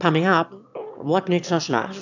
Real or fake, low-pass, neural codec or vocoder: fake; 7.2 kHz; autoencoder, 22.05 kHz, a latent of 192 numbers a frame, VITS, trained on one speaker